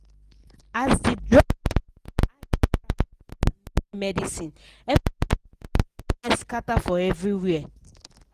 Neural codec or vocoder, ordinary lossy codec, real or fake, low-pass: none; Opus, 24 kbps; real; 14.4 kHz